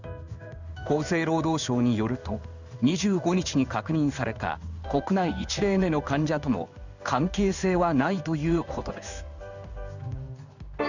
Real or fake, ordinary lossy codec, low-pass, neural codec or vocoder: fake; none; 7.2 kHz; codec, 16 kHz in and 24 kHz out, 1 kbps, XY-Tokenizer